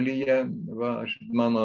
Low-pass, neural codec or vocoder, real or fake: 7.2 kHz; none; real